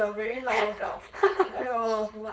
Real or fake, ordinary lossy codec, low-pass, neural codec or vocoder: fake; none; none; codec, 16 kHz, 4.8 kbps, FACodec